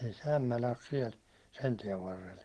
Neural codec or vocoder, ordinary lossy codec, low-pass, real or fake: none; Opus, 16 kbps; 10.8 kHz; real